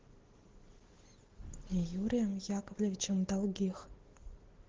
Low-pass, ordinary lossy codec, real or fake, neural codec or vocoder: 7.2 kHz; Opus, 16 kbps; real; none